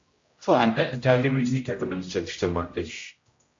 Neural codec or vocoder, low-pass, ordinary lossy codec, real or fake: codec, 16 kHz, 0.5 kbps, X-Codec, HuBERT features, trained on general audio; 7.2 kHz; MP3, 48 kbps; fake